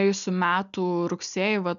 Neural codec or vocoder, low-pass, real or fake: none; 7.2 kHz; real